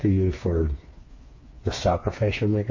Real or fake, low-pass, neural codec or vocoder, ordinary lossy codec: fake; 7.2 kHz; codec, 16 kHz, 4 kbps, FreqCodec, smaller model; MP3, 32 kbps